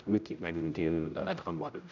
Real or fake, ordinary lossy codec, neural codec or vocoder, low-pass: fake; none; codec, 16 kHz, 0.5 kbps, X-Codec, HuBERT features, trained on general audio; 7.2 kHz